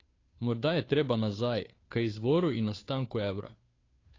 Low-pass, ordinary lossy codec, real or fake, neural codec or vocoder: 7.2 kHz; AAC, 32 kbps; fake; codec, 16 kHz, 2 kbps, FunCodec, trained on Chinese and English, 25 frames a second